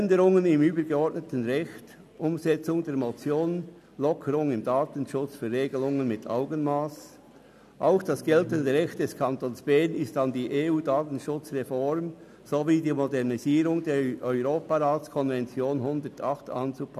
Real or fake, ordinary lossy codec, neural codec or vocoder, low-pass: real; none; none; 14.4 kHz